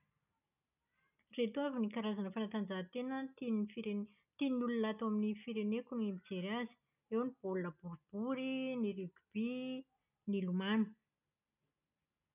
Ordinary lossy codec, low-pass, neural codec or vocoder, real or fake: none; 3.6 kHz; none; real